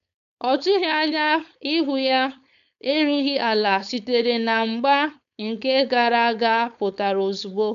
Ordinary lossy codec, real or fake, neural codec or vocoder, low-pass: none; fake; codec, 16 kHz, 4.8 kbps, FACodec; 7.2 kHz